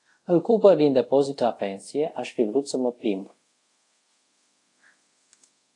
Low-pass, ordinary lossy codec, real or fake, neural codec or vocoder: 10.8 kHz; AAC, 48 kbps; fake; codec, 24 kHz, 0.5 kbps, DualCodec